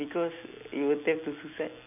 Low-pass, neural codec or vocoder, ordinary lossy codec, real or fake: 3.6 kHz; none; none; real